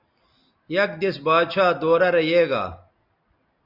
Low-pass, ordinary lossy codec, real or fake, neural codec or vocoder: 5.4 kHz; Opus, 64 kbps; real; none